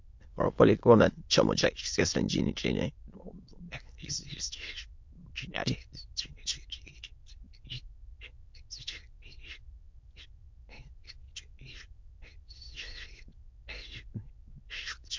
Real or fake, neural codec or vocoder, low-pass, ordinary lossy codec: fake; autoencoder, 22.05 kHz, a latent of 192 numbers a frame, VITS, trained on many speakers; 7.2 kHz; MP3, 48 kbps